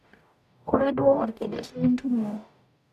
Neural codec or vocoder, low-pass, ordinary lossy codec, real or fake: codec, 44.1 kHz, 0.9 kbps, DAC; 14.4 kHz; none; fake